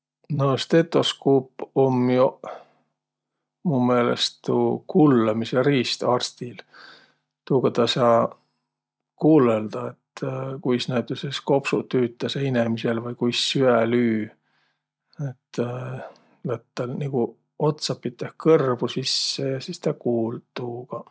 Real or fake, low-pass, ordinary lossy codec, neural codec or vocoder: real; none; none; none